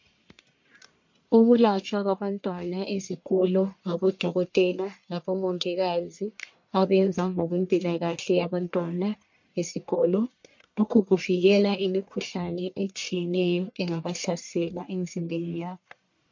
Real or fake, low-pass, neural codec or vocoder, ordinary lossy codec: fake; 7.2 kHz; codec, 44.1 kHz, 1.7 kbps, Pupu-Codec; MP3, 48 kbps